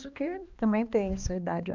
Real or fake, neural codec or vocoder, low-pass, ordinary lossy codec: fake; codec, 16 kHz, 2 kbps, X-Codec, HuBERT features, trained on balanced general audio; 7.2 kHz; Opus, 64 kbps